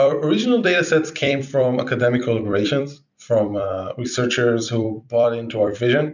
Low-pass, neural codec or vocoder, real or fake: 7.2 kHz; vocoder, 44.1 kHz, 128 mel bands every 256 samples, BigVGAN v2; fake